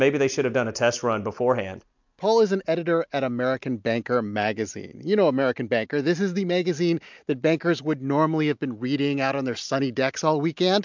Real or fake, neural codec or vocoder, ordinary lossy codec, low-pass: real; none; MP3, 64 kbps; 7.2 kHz